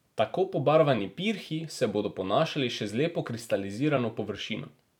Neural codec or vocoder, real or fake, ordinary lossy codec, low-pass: vocoder, 44.1 kHz, 128 mel bands every 256 samples, BigVGAN v2; fake; none; 19.8 kHz